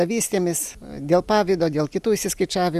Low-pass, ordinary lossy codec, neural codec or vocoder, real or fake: 14.4 kHz; Opus, 64 kbps; none; real